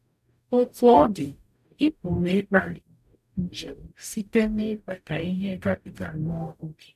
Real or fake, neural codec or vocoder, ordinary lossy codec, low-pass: fake; codec, 44.1 kHz, 0.9 kbps, DAC; none; 14.4 kHz